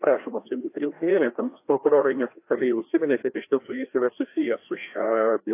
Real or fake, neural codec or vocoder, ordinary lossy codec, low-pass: fake; codec, 16 kHz, 1 kbps, FreqCodec, larger model; AAC, 24 kbps; 3.6 kHz